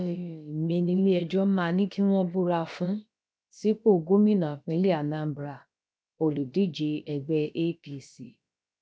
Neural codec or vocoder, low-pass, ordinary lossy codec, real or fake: codec, 16 kHz, about 1 kbps, DyCAST, with the encoder's durations; none; none; fake